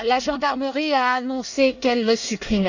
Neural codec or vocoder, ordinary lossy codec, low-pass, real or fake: codec, 24 kHz, 1 kbps, SNAC; none; 7.2 kHz; fake